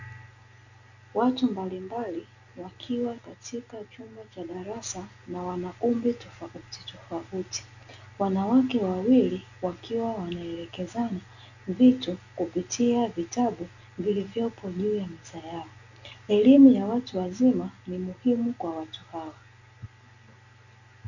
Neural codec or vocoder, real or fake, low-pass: none; real; 7.2 kHz